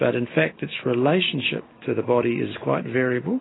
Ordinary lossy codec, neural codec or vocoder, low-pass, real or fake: AAC, 16 kbps; none; 7.2 kHz; real